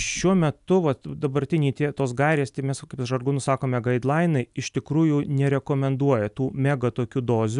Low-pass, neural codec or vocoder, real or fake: 10.8 kHz; none; real